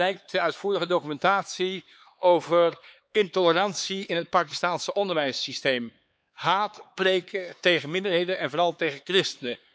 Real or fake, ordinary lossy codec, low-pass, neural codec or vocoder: fake; none; none; codec, 16 kHz, 4 kbps, X-Codec, HuBERT features, trained on LibriSpeech